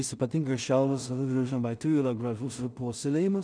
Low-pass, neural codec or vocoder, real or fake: 9.9 kHz; codec, 16 kHz in and 24 kHz out, 0.4 kbps, LongCat-Audio-Codec, two codebook decoder; fake